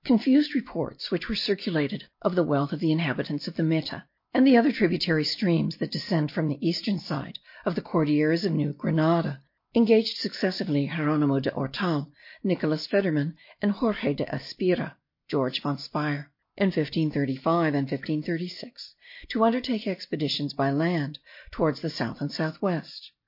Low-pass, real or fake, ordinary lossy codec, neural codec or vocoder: 5.4 kHz; real; MP3, 32 kbps; none